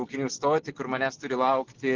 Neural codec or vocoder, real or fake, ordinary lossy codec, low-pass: none; real; Opus, 16 kbps; 7.2 kHz